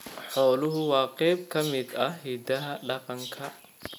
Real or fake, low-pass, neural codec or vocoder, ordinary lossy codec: real; 19.8 kHz; none; none